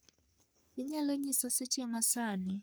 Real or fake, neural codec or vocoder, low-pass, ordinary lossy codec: fake; codec, 44.1 kHz, 3.4 kbps, Pupu-Codec; none; none